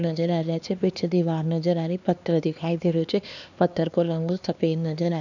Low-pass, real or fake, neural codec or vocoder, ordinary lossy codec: 7.2 kHz; fake; codec, 16 kHz, 2 kbps, X-Codec, HuBERT features, trained on LibriSpeech; none